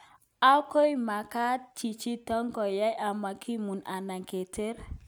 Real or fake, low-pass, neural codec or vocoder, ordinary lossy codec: real; none; none; none